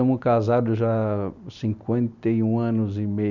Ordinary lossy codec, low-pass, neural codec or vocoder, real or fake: none; 7.2 kHz; none; real